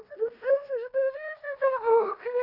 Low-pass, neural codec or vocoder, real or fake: 5.4 kHz; codec, 16 kHz in and 24 kHz out, 0.9 kbps, LongCat-Audio-Codec, fine tuned four codebook decoder; fake